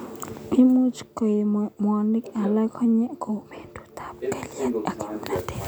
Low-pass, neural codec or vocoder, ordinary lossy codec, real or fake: none; none; none; real